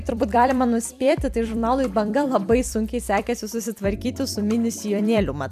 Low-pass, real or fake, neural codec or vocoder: 14.4 kHz; fake; vocoder, 44.1 kHz, 128 mel bands every 256 samples, BigVGAN v2